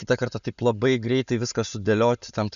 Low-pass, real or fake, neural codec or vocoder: 7.2 kHz; real; none